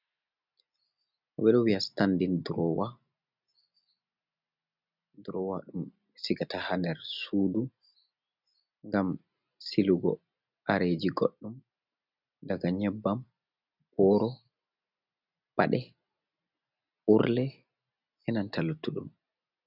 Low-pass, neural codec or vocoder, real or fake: 5.4 kHz; none; real